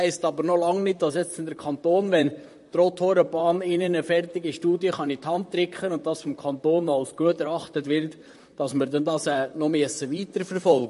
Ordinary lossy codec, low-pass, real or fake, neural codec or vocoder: MP3, 48 kbps; 14.4 kHz; fake; vocoder, 44.1 kHz, 128 mel bands, Pupu-Vocoder